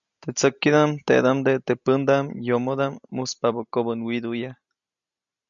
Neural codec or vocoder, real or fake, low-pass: none; real; 7.2 kHz